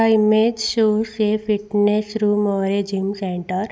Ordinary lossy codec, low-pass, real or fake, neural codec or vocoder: none; none; real; none